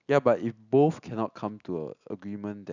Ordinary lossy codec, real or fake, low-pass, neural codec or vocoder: none; real; 7.2 kHz; none